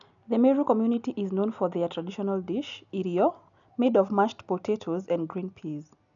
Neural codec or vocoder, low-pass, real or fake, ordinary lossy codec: none; 7.2 kHz; real; none